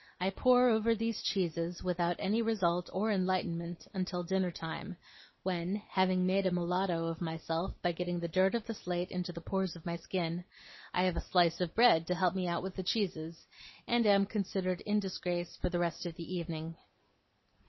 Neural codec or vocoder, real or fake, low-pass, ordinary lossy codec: none; real; 7.2 kHz; MP3, 24 kbps